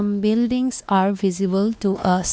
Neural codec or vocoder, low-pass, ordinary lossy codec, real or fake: codec, 16 kHz, 2 kbps, X-Codec, WavLM features, trained on Multilingual LibriSpeech; none; none; fake